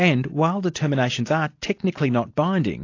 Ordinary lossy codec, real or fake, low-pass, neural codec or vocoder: AAC, 48 kbps; real; 7.2 kHz; none